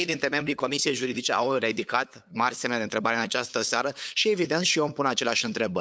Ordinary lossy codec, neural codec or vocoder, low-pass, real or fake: none; codec, 16 kHz, 8 kbps, FunCodec, trained on LibriTTS, 25 frames a second; none; fake